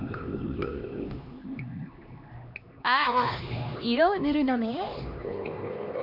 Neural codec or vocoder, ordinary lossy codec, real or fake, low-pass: codec, 16 kHz, 2 kbps, X-Codec, HuBERT features, trained on LibriSpeech; none; fake; 5.4 kHz